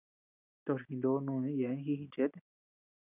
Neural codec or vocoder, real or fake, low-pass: none; real; 3.6 kHz